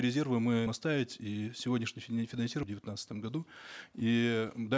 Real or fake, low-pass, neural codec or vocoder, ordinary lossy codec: real; none; none; none